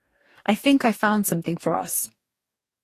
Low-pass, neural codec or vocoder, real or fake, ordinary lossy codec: 14.4 kHz; codec, 44.1 kHz, 2.6 kbps, DAC; fake; AAC, 48 kbps